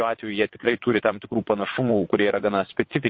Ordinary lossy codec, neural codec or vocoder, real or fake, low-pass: MP3, 32 kbps; vocoder, 22.05 kHz, 80 mel bands, WaveNeXt; fake; 7.2 kHz